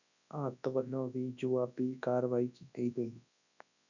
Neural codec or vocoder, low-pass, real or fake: codec, 24 kHz, 0.9 kbps, WavTokenizer, large speech release; 7.2 kHz; fake